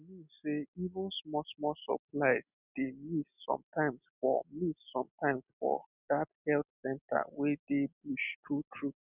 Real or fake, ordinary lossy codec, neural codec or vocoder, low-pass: real; none; none; 3.6 kHz